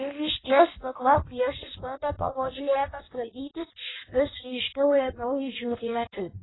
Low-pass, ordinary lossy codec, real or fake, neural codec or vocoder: 7.2 kHz; AAC, 16 kbps; fake; codec, 16 kHz in and 24 kHz out, 0.6 kbps, FireRedTTS-2 codec